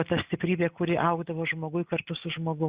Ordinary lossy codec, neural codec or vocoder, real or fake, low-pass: Opus, 64 kbps; none; real; 3.6 kHz